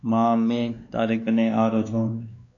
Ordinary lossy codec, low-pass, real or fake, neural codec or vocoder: MP3, 64 kbps; 7.2 kHz; fake; codec, 16 kHz, 2 kbps, X-Codec, WavLM features, trained on Multilingual LibriSpeech